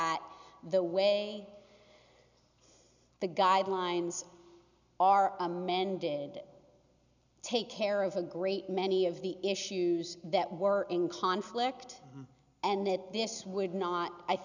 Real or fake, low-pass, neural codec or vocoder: real; 7.2 kHz; none